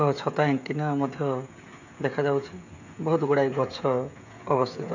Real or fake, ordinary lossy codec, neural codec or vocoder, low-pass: fake; none; codec, 16 kHz, 16 kbps, FreqCodec, smaller model; 7.2 kHz